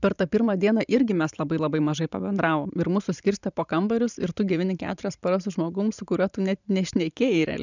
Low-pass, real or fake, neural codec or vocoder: 7.2 kHz; fake; vocoder, 44.1 kHz, 128 mel bands every 512 samples, BigVGAN v2